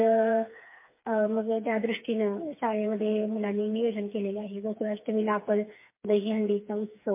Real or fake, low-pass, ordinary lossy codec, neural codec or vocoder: fake; 3.6 kHz; MP3, 24 kbps; codec, 16 kHz, 4 kbps, FreqCodec, smaller model